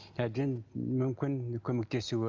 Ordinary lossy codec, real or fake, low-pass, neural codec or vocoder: Opus, 32 kbps; real; 7.2 kHz; none